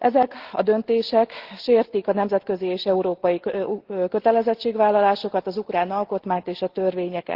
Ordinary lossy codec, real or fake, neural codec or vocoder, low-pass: Opus, 16 kbps; real; none; 5.4 kHz